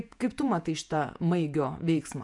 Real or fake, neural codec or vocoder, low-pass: fake; vocoder, 48 kHz, 128 mel bands, Vocos; 10.8 kHz